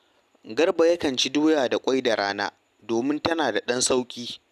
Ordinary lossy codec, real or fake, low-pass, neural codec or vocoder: none; real; 14.4 kHz; none